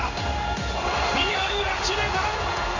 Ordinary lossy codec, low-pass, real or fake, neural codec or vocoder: none; 7.2 kHz; fake; codec, 16 kHz in and 24 kHz out, 1 kbps, XY-Tokenizer